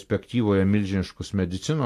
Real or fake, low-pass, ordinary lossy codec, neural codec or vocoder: real; 14.4 kHz; AAC, 64 kbps; none